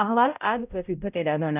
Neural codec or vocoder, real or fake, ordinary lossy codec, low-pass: codec, 16 kHz, 0.5 kbps, X-Codec, HuBERT features, trained on balanced general audio; fake; none; 3.6 kHz